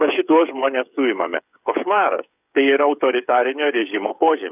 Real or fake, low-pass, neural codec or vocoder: fake; 3.6 kHz; codec, 16 kHz, 8 kbps, FreqCodec, smaller model